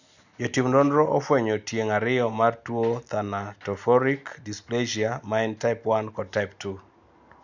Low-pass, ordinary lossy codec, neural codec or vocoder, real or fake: 7.2 kHz; none; none; real